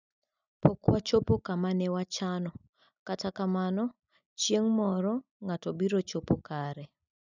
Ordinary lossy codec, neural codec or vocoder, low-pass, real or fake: none; none; 7.2 kHz; real